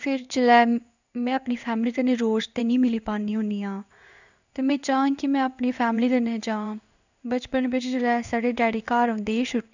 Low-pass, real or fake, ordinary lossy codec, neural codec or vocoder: 7.2 kHz; fake; none; codec, 16 kHz in and 24 kHz out, 1 kbps, XY-Tokenizer